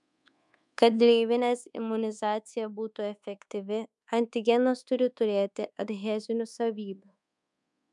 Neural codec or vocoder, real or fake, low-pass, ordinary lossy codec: codec, 24 kHz, 1.2 kbps, DualCodec; fake; 10.8 kHz; MP3, 96 kbps